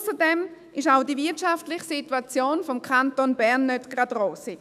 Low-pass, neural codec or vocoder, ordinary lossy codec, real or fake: 14.4 kHz; autoencoder, 48 kHz, 128 numbers a frame, DAC-VAE, trained on Japanese speech; none; fake